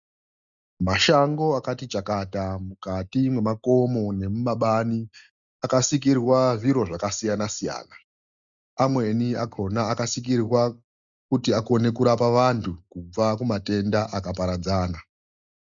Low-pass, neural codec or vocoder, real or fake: 7.2 kHz; none; real